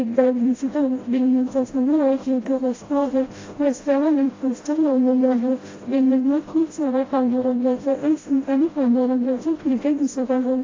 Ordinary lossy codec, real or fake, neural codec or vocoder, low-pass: AAC, 32 kbps; fake; codec, 16 kHz, 0.5 kbps, FreqCodec, smaller model; 7.2 kHz